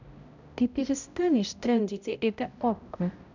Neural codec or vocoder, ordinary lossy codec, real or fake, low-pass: codec, 16 kHz, 0.5 kbps, X-Codec, HuBERT features, trained on balanced general audio; none; fake; 7.2 kHz